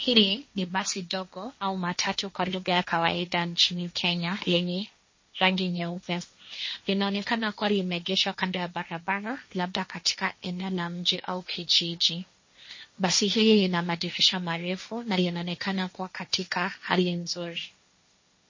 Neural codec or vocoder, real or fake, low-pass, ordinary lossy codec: codec, 16 kHz, 1.1 kbps, Voila-Tokenizer; fake; 7.2 kHz; MP3, 32 kbps